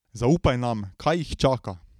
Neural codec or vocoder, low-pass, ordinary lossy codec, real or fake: none; 19.8 kHz; none; real